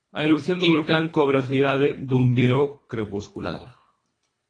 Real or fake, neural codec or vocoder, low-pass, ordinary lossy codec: fake; codec, 24 kHz, 1.5 kbps, HILCodec; 9.9 kHz; AAC, 32 kbps